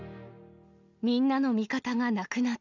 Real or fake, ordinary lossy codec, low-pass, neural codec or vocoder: real; none; 7.2 kHz; none